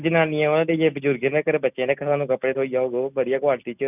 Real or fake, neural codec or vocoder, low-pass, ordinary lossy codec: real; none; 3.6 kHz; none